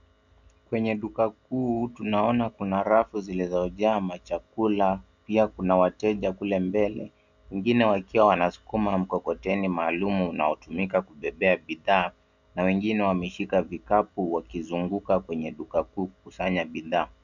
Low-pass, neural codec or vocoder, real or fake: 7.2 kHz; none; real